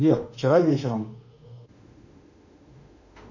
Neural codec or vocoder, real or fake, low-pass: autoencoder, 48 kHz, 32 numbers a frame, DAC-VAE, trained on Japanese speech; fake; 7.2 kHz